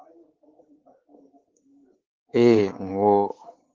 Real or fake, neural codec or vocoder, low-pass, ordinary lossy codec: fake; codec, 24 kHz, 3.1 kbps, DualCodec; 7.2 kHz; Opus, 16 kbps